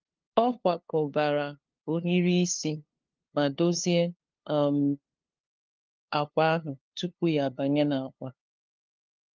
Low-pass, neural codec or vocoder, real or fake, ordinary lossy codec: 7.2 kHz; codec, 16 kHz, 2 kbps, FunCodec, trained on LibriTTS, 25 frames a second; fake; Opus, 32 kbps